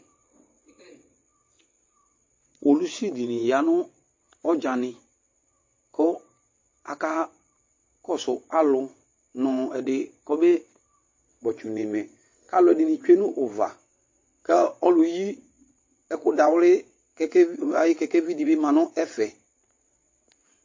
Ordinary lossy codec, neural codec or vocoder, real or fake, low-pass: MP3, 32 kbps; vocoder, 22.05 kHz, 80 mel bands, WaveNeXt; fake; 7.2 kHz